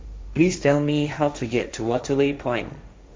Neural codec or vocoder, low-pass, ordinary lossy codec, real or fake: codec, 16 kHz, 1.1 kbps, Voila-Tokenizer; none; none; fake